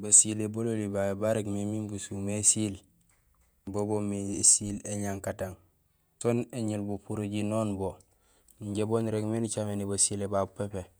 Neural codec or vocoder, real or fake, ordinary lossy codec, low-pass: vocoder, 48 kHz, 128 mel bands, Vocos; fake; none; none